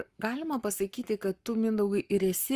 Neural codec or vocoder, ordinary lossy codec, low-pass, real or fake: codec, 44.1 kHz, 7.8 kbps, Pupu-Codec; Opus, 32 kbps; 14.4 kHz; fake